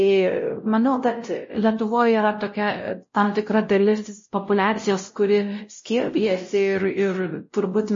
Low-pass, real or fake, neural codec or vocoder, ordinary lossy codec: 7.2 kHz; fake; codec, 16 kHz, 0.5 kbps, X-Codec, WavLM features, trained on Multilingual LibriSpeech; MP3, 32 kbps